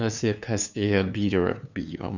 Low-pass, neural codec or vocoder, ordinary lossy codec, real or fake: 7.2 kHz; codec, 16 kHz, 2 kbps, FunCodec, trained on LibriTTS, 25 frames a second; none; fake